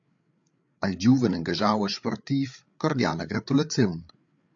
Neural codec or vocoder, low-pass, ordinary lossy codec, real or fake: codec, 16 kHz, 8 kbps, FreqCodec, larger model; 7.2 kHz; AAC, 64 kbps; fake